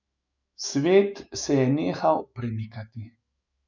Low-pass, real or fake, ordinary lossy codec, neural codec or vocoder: 7.2 kHz; fake; none; autoencoder, 48 kHz, 128 numbers a frame, DAC-VAE, trained on Japanese speech